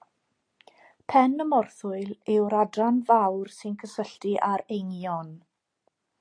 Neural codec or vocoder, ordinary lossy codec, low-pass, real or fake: none; AAC, 48 kbps; 9.9 kHz; real